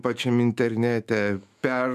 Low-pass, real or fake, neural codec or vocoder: 14.4 kHz; fake; autoencoder, 48 kHz, 128 numbers a frame, DAC-VAE, trained on Japanese speech